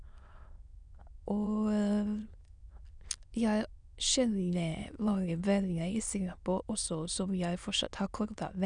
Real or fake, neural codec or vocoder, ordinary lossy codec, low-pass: fake; autoencoder, 22.05 kHz, a latent of 192 numbers a frame, VITS, trained on many speakers; none; 9.9 kHz